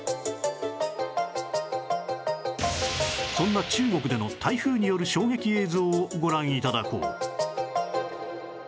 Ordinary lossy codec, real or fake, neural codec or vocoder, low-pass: none; real; none; none